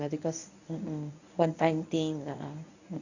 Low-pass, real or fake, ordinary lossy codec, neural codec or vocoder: 7.2 kHz; fake; none; codec, 24 kHz, 0.9 kbps, WavTokenizer, medium speech release version 1